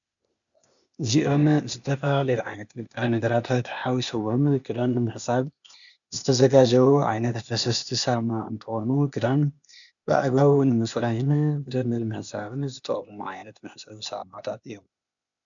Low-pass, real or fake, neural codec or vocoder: 7.2 kHz; fake; codec, 16 kHz, 0.8 kbps, ZipCodec